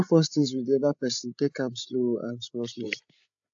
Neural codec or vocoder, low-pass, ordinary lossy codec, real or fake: codec, 16 kHz, 8 kbps, FreqCodec, larger model; 7.2 kHz; AAC, 64 kbps; fake